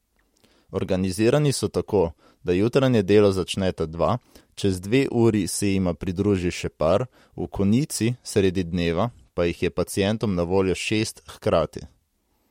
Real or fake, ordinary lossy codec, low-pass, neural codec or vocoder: real; MP3, 64 kbps; 19.8 kHz; none